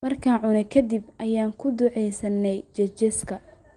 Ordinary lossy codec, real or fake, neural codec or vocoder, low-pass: Opus, 24 kbps; real; none; 9.9 kHz